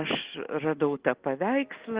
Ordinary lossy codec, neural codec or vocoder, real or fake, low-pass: Opus, 16 kbps; none; real; 3.6 kHz